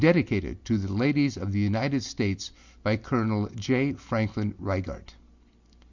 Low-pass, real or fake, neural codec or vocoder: 7.2 kHz; real; none